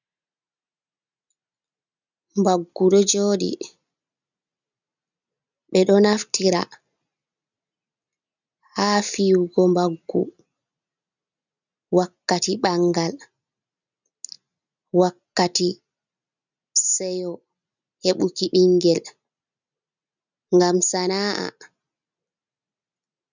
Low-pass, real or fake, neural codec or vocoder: 7.2 kHz; real; none